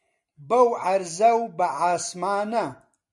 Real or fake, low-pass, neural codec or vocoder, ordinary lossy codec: real; 10.8 kHz; none; MP3, 64 kbps